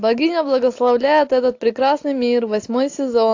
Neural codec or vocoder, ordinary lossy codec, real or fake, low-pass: none; AAC, 48 kbps; real; 7.2 kHz